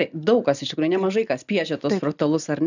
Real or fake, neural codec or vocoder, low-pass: real; none; 7.2 kHz